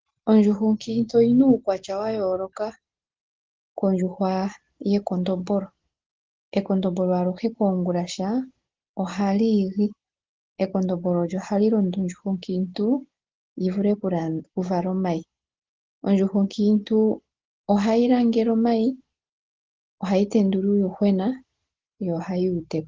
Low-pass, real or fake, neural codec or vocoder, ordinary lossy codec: 7.2 kHz; real; none; Opus, 16 kbps